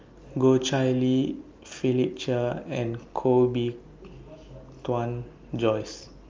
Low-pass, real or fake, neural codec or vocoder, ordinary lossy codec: 7.2 kHz; real; none; Opus, 32 kbps